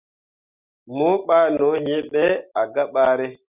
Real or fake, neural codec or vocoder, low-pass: real; none; 3.6 kHz